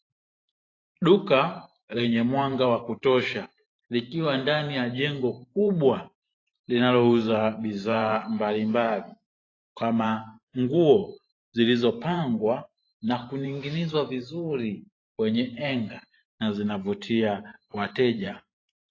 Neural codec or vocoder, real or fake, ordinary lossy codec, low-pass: vocoder, 44.1 kHz, 128 mel bands every 512 samples, BigVGAN v2; fake; AAC, 32 kbps; 7.2 kHz